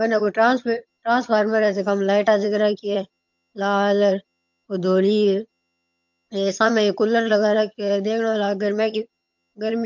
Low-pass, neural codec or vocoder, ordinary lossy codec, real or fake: 7.2 kHz; vocoder, 22.05 kHz, 80 mel bands, HiFi-GAN; MP3, 48 kbps; fake